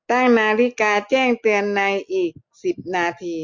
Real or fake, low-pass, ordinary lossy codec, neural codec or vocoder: real; 7.2 kHz; MP3, 48 kbps; none